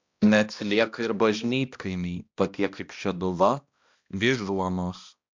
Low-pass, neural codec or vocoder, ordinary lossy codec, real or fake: 7.2 kHz; codec, 16 kHz, 1 kbps, X-Codec, HuBERT features, trained on balanced general audio; MP3, 64 kbps; fake